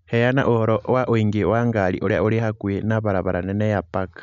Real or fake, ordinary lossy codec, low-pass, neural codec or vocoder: real; none; 7.2 kHz; none